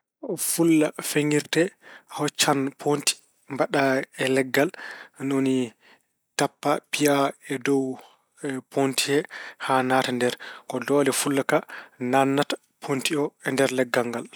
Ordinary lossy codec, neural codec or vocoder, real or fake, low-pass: none; none; real; none